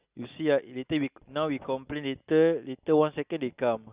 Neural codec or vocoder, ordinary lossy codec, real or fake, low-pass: none; Opus, 64 kbps; real; 3.6 kHz